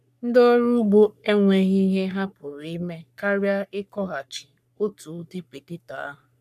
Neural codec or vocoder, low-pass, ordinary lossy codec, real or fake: codec, 44.1 kHz, 3.4 kbps, Pupu-Codec; 14.4 kHz; none; fake